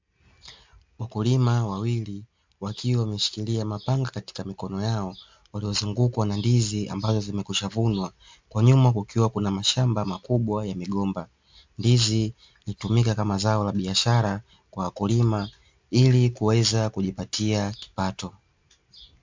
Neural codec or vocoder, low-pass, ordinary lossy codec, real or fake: none; 7.2 kHz; MP3, 64 kbps; real